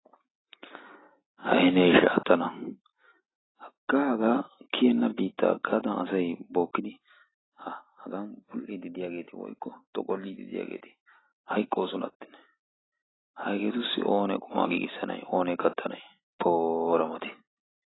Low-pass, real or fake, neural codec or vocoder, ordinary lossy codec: 7.2 kHz; real; none; AAC, 16 kbps